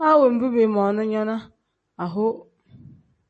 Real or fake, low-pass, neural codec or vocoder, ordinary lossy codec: real; 10.8 kHz; none; MP3, 32 kbps